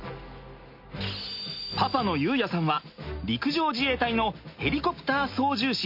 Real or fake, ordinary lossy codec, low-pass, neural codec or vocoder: real; MP3, 32 kbps; 5.4 kHz; none